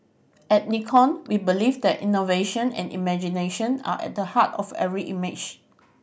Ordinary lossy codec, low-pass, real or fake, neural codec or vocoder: none; none; real; none